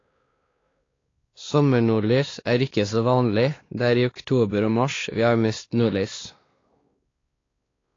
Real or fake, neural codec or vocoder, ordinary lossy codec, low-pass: fake; codec, 16 kHz, 2 kbps, X-Codec, WavLM features, trained on Multilingual LibriSpeech; AAC, 32 kbps; 7.2 kHz